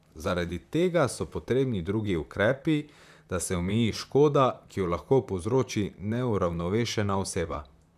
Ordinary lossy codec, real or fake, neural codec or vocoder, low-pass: none; fake; vocoder, 44.1 kHz, 128 mel bands, Pupu-Vocoder; 14.4 kHz